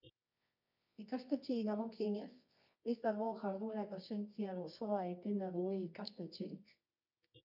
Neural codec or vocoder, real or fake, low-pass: codec, 24 kHz, 0.9 kbps, WavTokenizer, medium music audio release; fake; 5.4 kHz